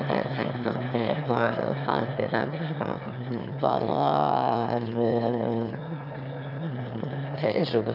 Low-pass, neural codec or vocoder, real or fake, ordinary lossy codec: 5.4 kHz; autoencoder, 22.05 kHz, a latent of 192 numbers a frame, VITS, trained on one speaker; fake; none